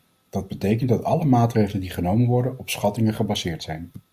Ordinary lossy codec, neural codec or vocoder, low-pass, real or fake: Opus, 64 kbps; none; 14.4 kHz; real